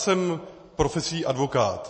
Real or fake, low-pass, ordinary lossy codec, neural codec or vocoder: real; 9.9 kHz; MP3, 32 kbps; none